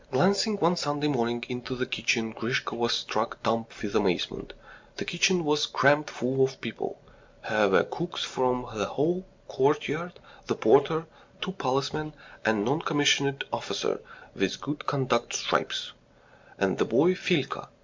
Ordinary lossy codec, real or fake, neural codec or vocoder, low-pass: MP3, 64 kbps; real; none; 7.2 kHz